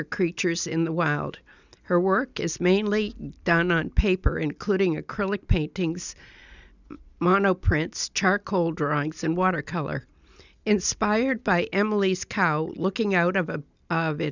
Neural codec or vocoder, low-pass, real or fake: none; 7.2 kHz; real